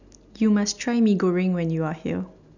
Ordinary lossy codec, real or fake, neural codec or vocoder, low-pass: none; real; none; 7.2 kHz